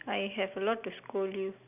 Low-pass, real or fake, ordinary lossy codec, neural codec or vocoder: 3.6 kHz; real; none; none